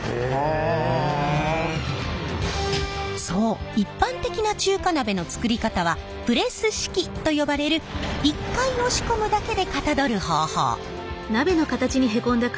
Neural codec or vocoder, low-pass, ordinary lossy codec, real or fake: none; none; none; real